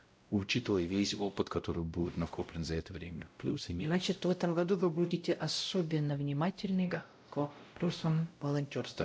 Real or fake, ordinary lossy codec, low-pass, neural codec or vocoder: fake; none; none; codec, 16 kHz, 0.5 kbps, X-Codec, WavLM features, trained on Multilingual LibriSpeech